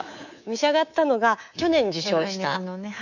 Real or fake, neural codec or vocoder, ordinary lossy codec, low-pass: fake; codec, 24 kHz, 3.1 kbps, DualCodec; none; 7.2 kHz